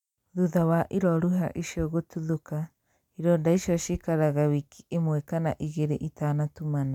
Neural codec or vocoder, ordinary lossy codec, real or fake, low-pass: none; none; real; 19.8 kHz